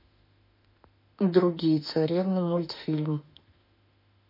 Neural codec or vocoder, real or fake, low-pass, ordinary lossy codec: autoencoder, 48 kHz, 32 numbers a frame, DAC-VAE, trained on Japanese speech; fake; 5.4 kHz; MP3, 32 kbps